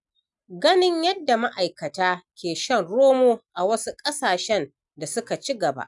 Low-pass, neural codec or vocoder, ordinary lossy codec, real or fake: 10.8 kHz; none; none; real